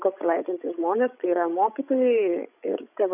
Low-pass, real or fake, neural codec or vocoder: 3.6 kHz; fake; codec, 16 kHz, 16 kbps, FreqCodec, larger model